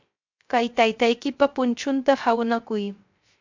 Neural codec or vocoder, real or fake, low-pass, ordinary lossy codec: codec, 16 kHz, 0.3 kbps, FocalCodec; fake; 7.2 kHz; MP3, 64 kbps